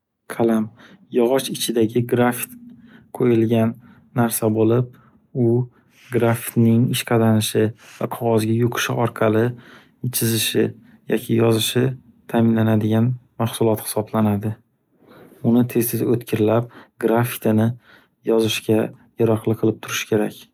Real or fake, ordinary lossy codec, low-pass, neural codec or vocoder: real; none; 19.8 kHz; none